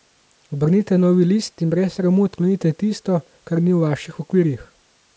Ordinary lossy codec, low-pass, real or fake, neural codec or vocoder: none; none; real; none